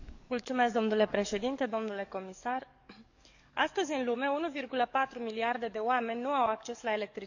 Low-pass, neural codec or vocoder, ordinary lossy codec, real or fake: 7.2 kHz; codec, 44.1 kHz, 7.8 kbps, DAC; none; fake